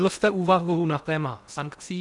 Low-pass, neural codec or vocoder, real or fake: 10.8 kHz; codec, 16 kHz in and 24 kHz out, 0.8 kbps, FocalCodec, streaming, 65536 codes; fake